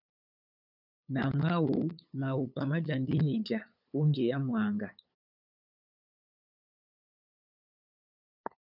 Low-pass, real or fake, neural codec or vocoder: 5.4 kHz; fake; codec, 16 kHz, 8 kbps, FunCodec, trained on LibriTTS, 25 frames a second